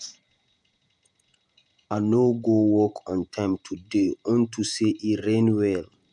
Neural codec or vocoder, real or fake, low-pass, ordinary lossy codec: vocoder, 48 kHz, 128 mel bands, Vocos; fake; 10.8 kHz; none